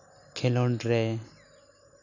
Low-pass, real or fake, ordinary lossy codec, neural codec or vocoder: 7.2 kHz; real; none; none